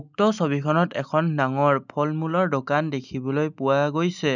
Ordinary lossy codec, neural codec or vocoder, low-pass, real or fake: none; none; 7.2 kHz; real